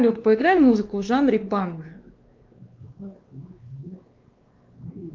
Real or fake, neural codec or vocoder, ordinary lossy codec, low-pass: fake; codec, 16 kHz, 2 kbps, X-Codec, WavLM features, trained on Multilingual LibriSpeech; Opus, 32 kbps; 7.2 kHz